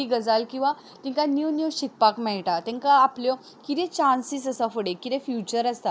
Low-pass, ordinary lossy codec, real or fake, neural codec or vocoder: none; none; real; none